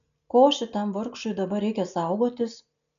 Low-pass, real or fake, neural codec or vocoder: 7.2 kHz; real; none